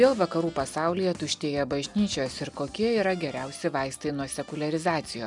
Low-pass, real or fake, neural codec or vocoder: 10.8 kHz; real; none